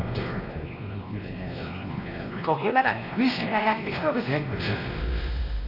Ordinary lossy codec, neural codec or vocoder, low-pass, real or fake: none; codec, 16 kHz, 1 kbps, X-Codec, WavLM features, trained on Multilingual LibriSpeech; 5.4 kHz; fake